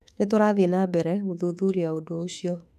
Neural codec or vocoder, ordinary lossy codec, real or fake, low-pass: autoencoder, 48 kHz, 32 numbers a frame, DAC-VAE, trained on Japanese speech; none; fake; 14.4 kHz